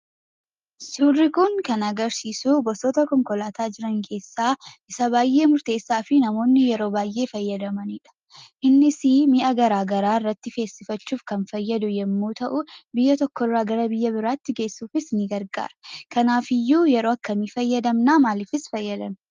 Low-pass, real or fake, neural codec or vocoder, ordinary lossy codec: 7.2 kHz; real; none; Opus, 24 kbps